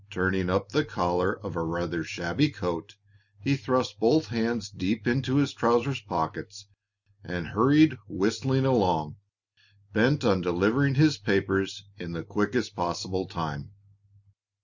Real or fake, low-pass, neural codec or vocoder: real; 7.2 kHz; none